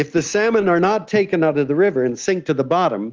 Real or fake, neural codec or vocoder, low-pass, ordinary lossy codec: real; none; 7.2 kHz; Opus, 24 kbps